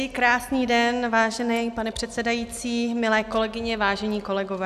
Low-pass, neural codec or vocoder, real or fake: 14.4 kHz; none; real